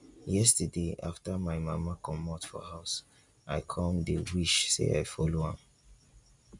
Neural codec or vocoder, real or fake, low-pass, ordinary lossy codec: none; real; 10.8 kHz; none